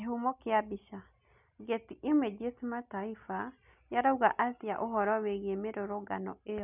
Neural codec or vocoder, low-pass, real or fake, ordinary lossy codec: none; 3.6 kHz; real; none